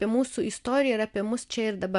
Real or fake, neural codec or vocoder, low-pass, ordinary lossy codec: real; none; 10.8 kHz; AAC, 96 kbps